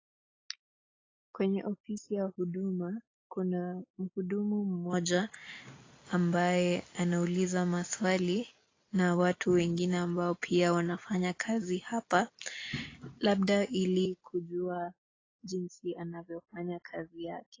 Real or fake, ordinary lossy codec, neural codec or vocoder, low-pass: real; AAC, 32 kbps; none; 7.2 kHz